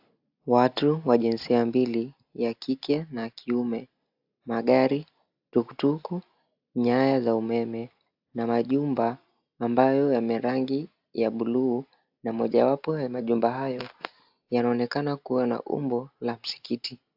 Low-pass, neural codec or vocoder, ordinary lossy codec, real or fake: 5.4 kHz; none; AAC, 48 kbps; real